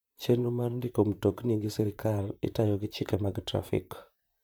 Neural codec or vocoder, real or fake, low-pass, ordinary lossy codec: vocoder, 44.1 kHz, 128 mel bands, Pupu-Vocoder; fake; none; none